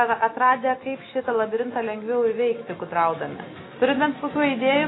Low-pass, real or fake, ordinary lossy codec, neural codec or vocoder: 7.2 kHz; real; AAC, 16 kbps; none